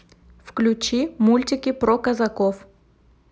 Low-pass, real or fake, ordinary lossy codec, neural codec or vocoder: none; real; none; none